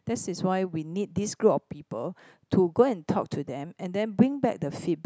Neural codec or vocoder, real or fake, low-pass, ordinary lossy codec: none; real; none; none